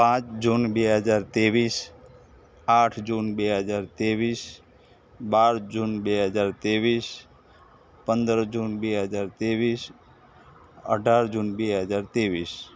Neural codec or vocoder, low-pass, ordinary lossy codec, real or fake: none; none; none; real